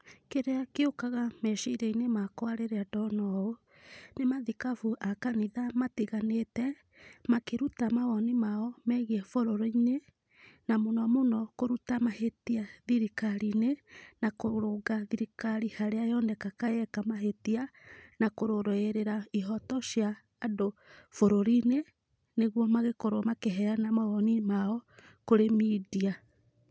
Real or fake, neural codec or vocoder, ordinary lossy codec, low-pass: real; none; none; none